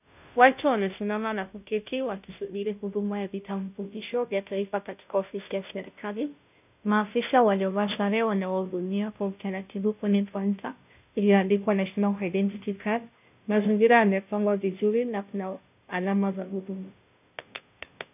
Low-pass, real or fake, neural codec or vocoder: 3.6 kHz; fake; codec, 16 kHz, 0.5 kbps, FunCodec, trained on Chinese and English, 25 frames a second